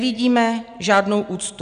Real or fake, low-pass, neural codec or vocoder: real; 9.9 kHz; none